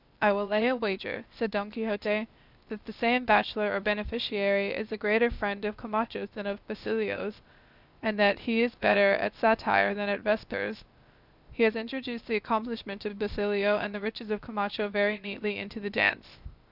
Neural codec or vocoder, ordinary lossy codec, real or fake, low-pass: codec, 16 kHz, 0.8 kbps, ZipCodec; Opus, 64 kbps; fake; 5.4 kHz